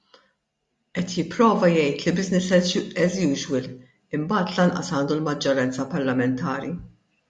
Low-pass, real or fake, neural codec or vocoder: 10.8 kHz; real; none